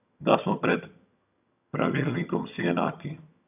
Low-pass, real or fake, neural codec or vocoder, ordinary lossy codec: 3.6 kHz; fake; vocoder, 22.05 kHz, 80 mel bands, HiFi-GAN; none